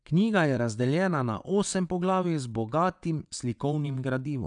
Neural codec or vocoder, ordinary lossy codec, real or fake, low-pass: vocoder, 22.05 kHz, 80 mel bands, WaveNeXt; none; fake; 9.9 kHz